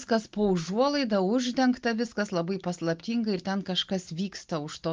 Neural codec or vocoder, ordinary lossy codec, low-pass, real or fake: none; Opus, 32 kbps; 7.2 kHz; real